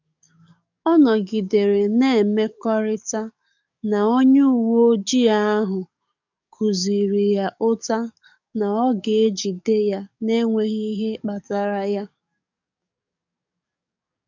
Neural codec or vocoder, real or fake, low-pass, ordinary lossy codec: codec, 44.1 kHz, 7.8 kbps, DAC; fake; 7.2 kHz; none